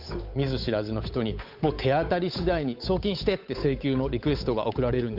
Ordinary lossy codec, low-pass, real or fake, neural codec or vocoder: none; 5.4 kHz; fake; codec, 16 kHz, 8 kbps, FunCodec, trained on Chinese and English, 25 frames a second